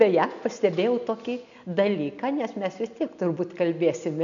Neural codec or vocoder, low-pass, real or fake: none; 7.2 kHz; real